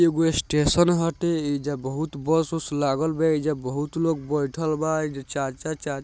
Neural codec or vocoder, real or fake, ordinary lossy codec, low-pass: none; real; none; none